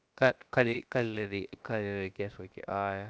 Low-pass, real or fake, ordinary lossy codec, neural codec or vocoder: none; fake; none; codec, 16 kHz, about 1 kbps, DyCAST, with the encoder's durations